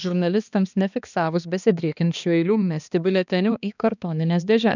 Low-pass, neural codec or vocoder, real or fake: 7.2 kHz; codec, 16 kHz, 2 kbps, X-Codec, HuBERT features, trained on balanced general audio; fake